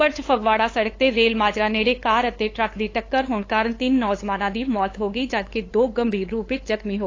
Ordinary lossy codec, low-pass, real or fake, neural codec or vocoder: AAC, 32 kbps; 7.2 kHz; fake; codec, 16 kHz, 8 kbps, FunCodec, trained on LibriTTS, 25 frames a second